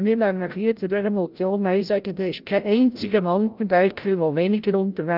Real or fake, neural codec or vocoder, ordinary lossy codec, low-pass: fake; codec, 16 kHz, 0.5 kbps, FreqCodec, larger model; Opus, 24 kbps; 5.4 kHz